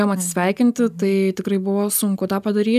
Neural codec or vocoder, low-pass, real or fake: none; 14.4 kHz; real